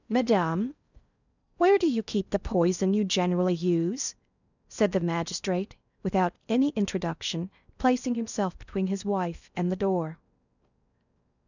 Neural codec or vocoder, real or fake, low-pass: codec, 16 kHz in and 24 kHz out, 0.6 kbps, FocalCodec, streaming, 4096 codes; fake; 7.2 kHz